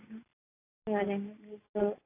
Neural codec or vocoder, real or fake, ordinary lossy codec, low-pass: none; real; AAC, 32 kbps; 3.6 kHz